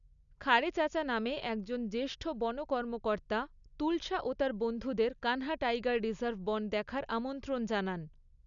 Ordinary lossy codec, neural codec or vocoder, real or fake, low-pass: none; none; real; 7.2 kHz